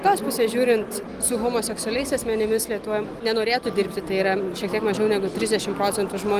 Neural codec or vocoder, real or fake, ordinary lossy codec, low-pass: none; real; Opus, 24 kbps; 14.4 kHz